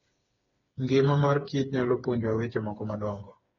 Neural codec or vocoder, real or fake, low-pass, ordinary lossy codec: codec, 16 kHz, 4 kbps, FreqCodec, smaller model; fake; 7.2 kHz; AAC, 24 kbps